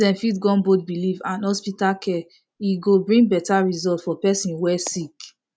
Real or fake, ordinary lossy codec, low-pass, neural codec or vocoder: real; none; none; none